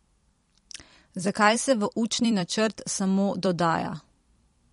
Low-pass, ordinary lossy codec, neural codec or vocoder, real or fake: 19.8 kHz; MP3, 48 kbps; vocoder, 44.1 kHz, 128 mel bands every 256 samples, BigVGAN v2; fake